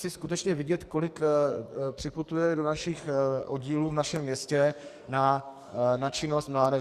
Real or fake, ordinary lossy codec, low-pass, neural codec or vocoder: fake; Opus, 64 kbps; 14.4 kHz; codec, 44.1 kHz, 2.6 kbps, SNAC